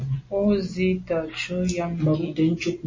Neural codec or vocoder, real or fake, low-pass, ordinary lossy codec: none; real; 7.2 kHz; MP3, 32 kbps